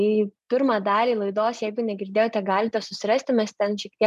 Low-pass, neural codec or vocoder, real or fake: 14.4 kHz; none; real